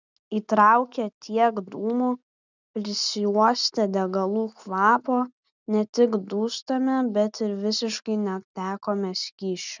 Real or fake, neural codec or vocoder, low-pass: real; none; 7.2 kHz